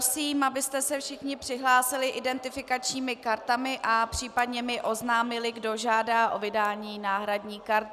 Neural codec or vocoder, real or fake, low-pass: none; real; 14.4 kHz